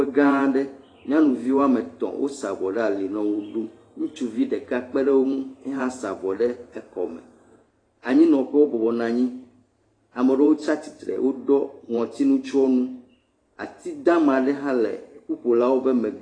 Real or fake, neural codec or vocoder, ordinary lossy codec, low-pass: fake; vocoder, 44.1 kHz, 128 mel bands every 512 samples, BigVGAN v2; AAC, 32 kbps; 9.9 kHz